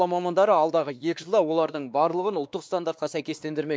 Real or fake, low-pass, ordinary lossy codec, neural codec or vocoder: fake; none; none; codec, 16 kHz, 2 kbps, X-Codec, WavLM features, trained on Multilingual LibriSpeech